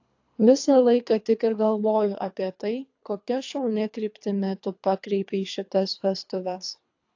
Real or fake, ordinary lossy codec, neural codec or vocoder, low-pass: fake; AAC, 48 kbps; codec, 24 kHz, 3 kbps, HILCodec; 7.2 kHz